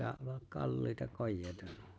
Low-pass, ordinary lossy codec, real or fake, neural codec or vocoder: none; none; real; none